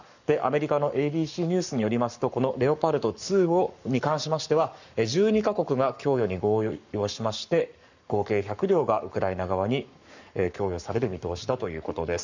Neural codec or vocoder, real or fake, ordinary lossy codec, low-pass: codec, 44.1 kHz, 7.8 kbps, Pupu-Codec; fake; none; 7.2 kHz